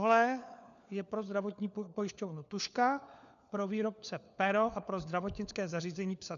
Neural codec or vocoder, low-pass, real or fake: codec, 16 kHz, 4 kbps, FunCodec, trained on LibriTTS, 50 frames a second; 7.2 kHz; fake